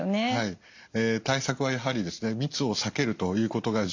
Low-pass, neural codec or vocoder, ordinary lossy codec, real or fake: 7.2 kHz; none; MP3, 64 kbps; real